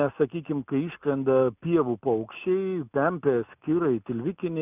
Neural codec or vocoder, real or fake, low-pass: none; real; 3.6 kHz